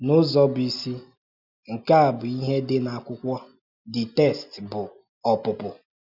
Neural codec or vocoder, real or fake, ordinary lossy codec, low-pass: none; real; none; 5.4 kHz